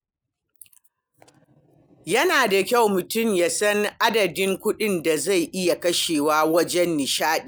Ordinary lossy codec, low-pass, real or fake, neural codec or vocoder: none; none; real; none